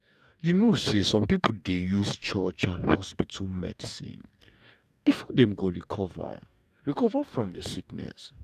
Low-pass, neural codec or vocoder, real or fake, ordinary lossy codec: 14.4 kHz; codec, 44.1 kHz, 2.6 kbps, DAC; fake; none